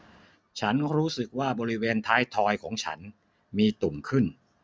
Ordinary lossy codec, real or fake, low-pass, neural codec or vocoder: none; real; none; none